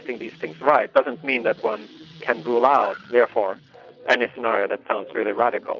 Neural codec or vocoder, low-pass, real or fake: vocoder, 22.05 kHz, 80 mel bands, WaveNeXt; 7.2 kHz; fake